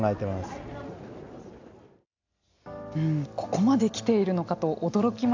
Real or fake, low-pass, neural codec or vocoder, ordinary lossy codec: real; 7.2 kHz; none; AAC, 48 kbps